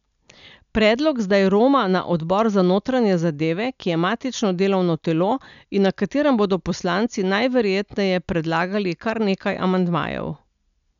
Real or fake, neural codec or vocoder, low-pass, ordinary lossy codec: real; none; 7.2 kHz; none